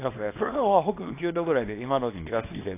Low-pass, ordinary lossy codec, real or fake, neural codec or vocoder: 3.6 kHz; AAC, 24 kbps; fake; codec, 24 kHz, 0.9 kbps, WavTokenizer, small release